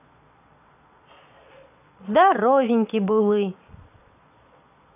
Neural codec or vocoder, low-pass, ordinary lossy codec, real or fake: vocoder, 22.05 kHz, 80 mel bands, Vocos; 3.6 kHz; none; fake